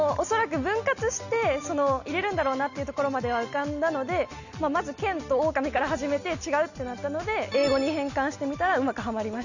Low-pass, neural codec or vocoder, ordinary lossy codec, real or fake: 7.2 kHz; none; none; real